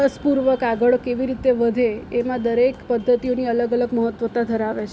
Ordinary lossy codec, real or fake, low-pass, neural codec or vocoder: none; real; none; none